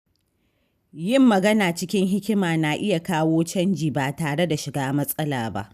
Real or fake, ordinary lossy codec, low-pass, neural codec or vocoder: real; none; 14.4 kHz; none